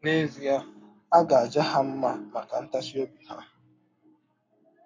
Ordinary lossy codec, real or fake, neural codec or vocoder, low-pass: MP3, 48 kbps; fake; codec, 44.1 kHz, 7.8 kbps, Pupu-Codec; 7.2 kHz